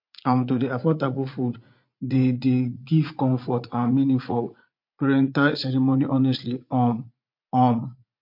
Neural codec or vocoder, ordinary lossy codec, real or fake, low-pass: vocoder, 44.1 kHz, 80 mel bands, Vocos; MP3, 48 kbps; fake; 5.4 kHz